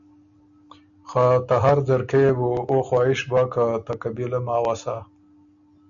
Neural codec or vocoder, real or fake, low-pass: none; real; 7.2 kHz